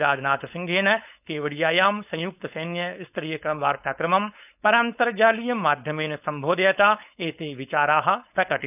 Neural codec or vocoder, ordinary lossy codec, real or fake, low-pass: codec, 16 kHz, 4.8 kbps, FACodec; none; fake; 3.6 kHz